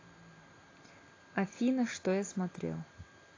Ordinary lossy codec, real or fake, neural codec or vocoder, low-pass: AAC, 32 kbps; fake; vocoder, 44.1 kHz, 128 mel bands every 256 samples, BigVGAN v2; 7.2 kHz